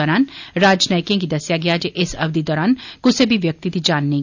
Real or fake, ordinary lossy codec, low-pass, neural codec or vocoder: real; none; 7.2 kHz; none